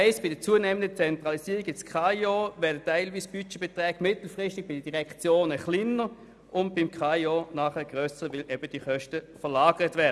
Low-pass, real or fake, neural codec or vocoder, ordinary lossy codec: none; real; none; none